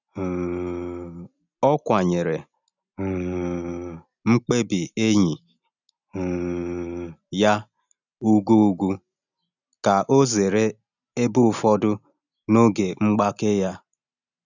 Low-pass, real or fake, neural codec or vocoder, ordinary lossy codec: 7.2 kHz; real; none; none